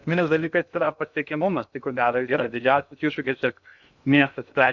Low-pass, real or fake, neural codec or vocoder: 7.2 kHz; fake; codec, 16 kHz in and 24 kHz out, 0.6 kbps, FocalCodec, streaming, 2048 codes